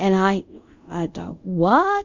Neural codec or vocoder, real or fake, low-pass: codec, 24 kHz, 0.5 kbps, DualCodec; fake; 7.2 kHz